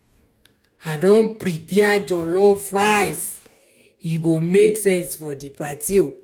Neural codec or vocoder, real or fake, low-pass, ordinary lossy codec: codec, 44.1 kHz, 2.6 kbps, DAC; fake; 19.8 kHz; none